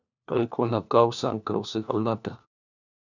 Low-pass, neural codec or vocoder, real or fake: 7.2 kHz; codec, 16 kHz, 1 kbps, FunCodec, trained on LibriTTS, 50 frames a second; fake